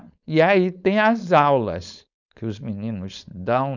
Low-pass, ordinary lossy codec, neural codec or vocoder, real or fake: 7.2 kHz; none; codec, 16 kHz, 4.8 kbps, FACodec; fake